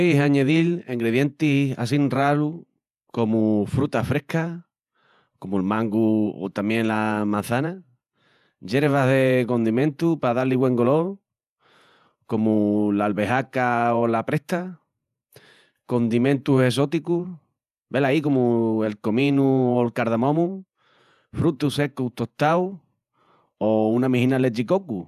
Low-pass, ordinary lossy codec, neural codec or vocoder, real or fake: 14.4 kHz; none; vocoder, 48 kHz, 128 mel bands, Vocos; fake